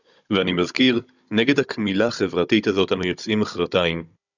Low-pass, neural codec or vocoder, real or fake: 7.2 kHz; codec, 16 kHz, 16 kbps, FunCodec, trained on Chinese and English, 50 frames a second; fake